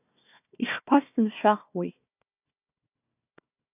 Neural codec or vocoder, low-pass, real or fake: codec, 16 kHz, 1 kbps, FunCodec, trained on Chinese and English, 50 frames a second; 3.6 kHz; fake